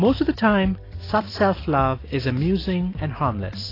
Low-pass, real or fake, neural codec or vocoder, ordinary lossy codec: 5.4 kHz; real; none; AAC, 24 kbps